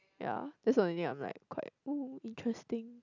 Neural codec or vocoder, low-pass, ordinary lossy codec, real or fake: none; 7.2 kHz; none; real